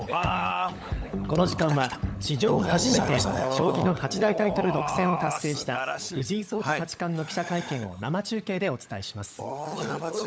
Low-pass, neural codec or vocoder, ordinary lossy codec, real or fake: none; codec, 16 kHz, 16 kbps, FunCodec, trained on LibriTTS, 50 frames a second; none; fake